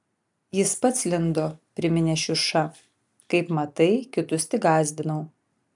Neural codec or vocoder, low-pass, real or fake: none; 10.8 kHz; real